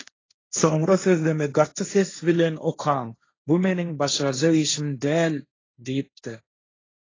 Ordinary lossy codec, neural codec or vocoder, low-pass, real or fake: AAC, 32 kbps; codec, 16 kHz, 1.1 kbps, Voila-Tokenizer; 7.2 kHz; fake